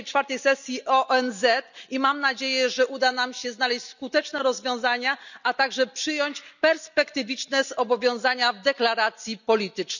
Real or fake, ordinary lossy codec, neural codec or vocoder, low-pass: real; none; none; 7.2 kHz